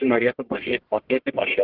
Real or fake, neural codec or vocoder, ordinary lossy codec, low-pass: fake; codec, 44.1 kHz, 1.7 kbps, Pupu-Codec; Opus, 16 kbps; 5.4 kHz